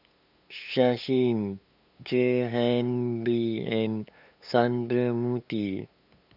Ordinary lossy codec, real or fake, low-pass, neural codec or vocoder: none; fake; 5.4 kHz; codec, 16 kHz, 8 kbps, FunCodec, trained on LibriTTS, 25 frames a second